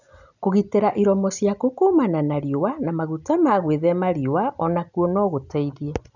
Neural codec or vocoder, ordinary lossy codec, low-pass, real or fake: none; none; 7.2 kHz; real